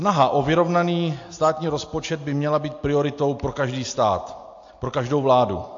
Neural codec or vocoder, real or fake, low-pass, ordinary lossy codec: none; real; 7.2 kHz; AAC, 48 kbps